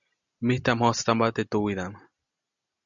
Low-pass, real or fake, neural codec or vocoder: 7.2 kHz; real; none